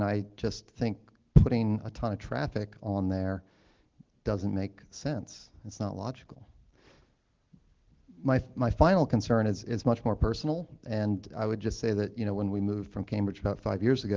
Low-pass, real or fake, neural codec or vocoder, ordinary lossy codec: 7.2 kHz; real; none; Opus, 16 kbps